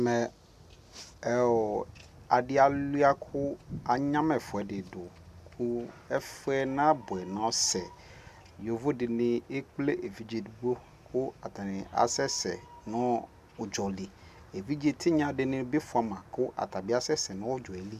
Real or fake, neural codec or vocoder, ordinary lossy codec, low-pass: real; none; AAC, 96 kbps; 14.4 kHz